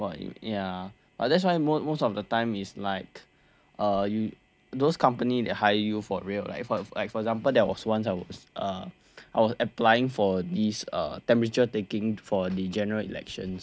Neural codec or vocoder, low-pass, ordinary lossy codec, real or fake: none; none; none; real